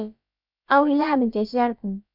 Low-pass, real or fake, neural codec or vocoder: 5.4 kHz; fake; codec, 16 kHz, about 1 kbps, DyCAST, with the encoder's durations